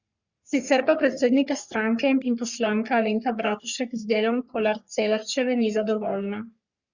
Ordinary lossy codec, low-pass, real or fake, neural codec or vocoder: Opus, 64 kbps; 7.2 kHz; fake; codec, 44.1 kHz, 3.4 kbps, Pupu-Codec